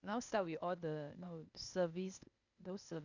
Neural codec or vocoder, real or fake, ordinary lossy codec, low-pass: codec, 16 kHz, 0.8 kbps, ZipCodec; fake; none; 7.2 kHz